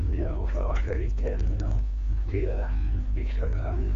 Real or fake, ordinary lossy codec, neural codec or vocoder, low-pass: fake; none; codec, 16 kHz, 2 kbps, FreqCodec, larger model; 7.2 kHz